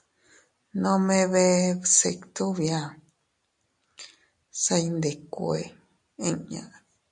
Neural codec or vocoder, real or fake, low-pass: none; real; 10.8 kHz